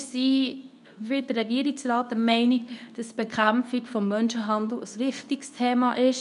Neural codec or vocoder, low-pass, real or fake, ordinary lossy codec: codec, 24 kHz, 0.9 kbps, WavTokenizer, medium speech release version 2; 10.8 kHz; fake; none